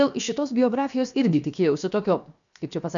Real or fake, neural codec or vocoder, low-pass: fake; codec, 16 kHz, 0.7 kbps, FocalCodec; 7.2 kHz